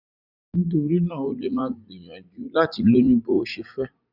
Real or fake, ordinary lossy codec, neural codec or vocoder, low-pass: fake; none; vocoder, 44.1 kHz, 80 mel bands, Vocos; 5.4 kHz